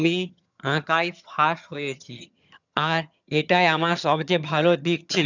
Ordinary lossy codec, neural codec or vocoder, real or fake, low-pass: none; vocoder, 22.05 kHz, 80 mel bands, HiFi-GAN; fake; 7.2 kHz